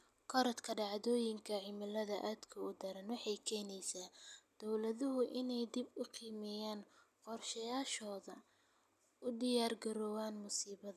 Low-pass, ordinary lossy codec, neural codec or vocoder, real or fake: 14.4 kHz; none; none; real